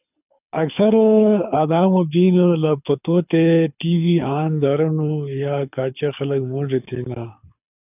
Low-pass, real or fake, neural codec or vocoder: 3.6 kHz; fake; codec, 24 kHz, 6 kbps, HILCodec